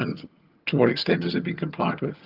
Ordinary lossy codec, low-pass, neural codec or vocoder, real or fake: Opus, 24 kbps; 5.4 kHz; vocoder, 22.05 kHz, 80 mel bands, HiFi-GAN; fake